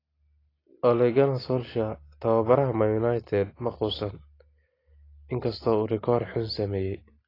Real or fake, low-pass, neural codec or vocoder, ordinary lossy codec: real; 5.4 kHz; none; AAC, 24 kbps